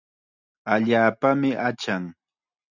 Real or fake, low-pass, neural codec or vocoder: real; 7.2 kHz; none